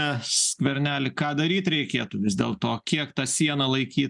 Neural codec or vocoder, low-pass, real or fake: none; 10.8 kHz; real